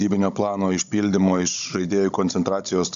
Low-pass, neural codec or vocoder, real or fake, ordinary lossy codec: 7.2 kHz; codec, 16 kHz, 16 kbps, FunCodec, trained on Chinese and English, 50 frames a second; fake; AAC, 96 kbps